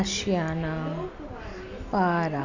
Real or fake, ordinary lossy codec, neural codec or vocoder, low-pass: real; none; none; 7.2 kHz